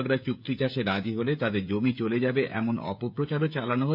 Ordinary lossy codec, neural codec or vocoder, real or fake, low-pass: none; codec, 16 kHz, 16 kbps, FreqCodec, smaller model; fake; 5.4 kHz